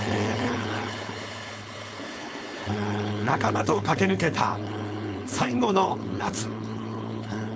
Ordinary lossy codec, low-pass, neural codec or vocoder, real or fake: none; none; codec, 16 kHz, 4.8 kbps, FACodec; fake